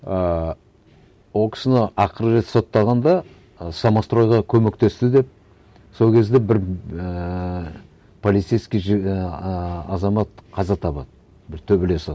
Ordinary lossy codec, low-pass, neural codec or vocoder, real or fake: none; none; none; real